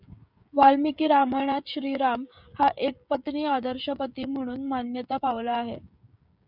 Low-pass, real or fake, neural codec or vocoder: 5.4 kHz; fake; codec, 16 kHz, 16 kbps, FreqCodec, smaller model